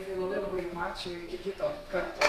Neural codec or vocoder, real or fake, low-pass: codec, 32 kHz, 1.9 kbps, SNAC; fake; 14.4 kHz